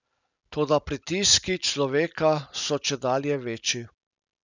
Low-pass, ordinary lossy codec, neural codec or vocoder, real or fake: 7.2 kHz; none; none; real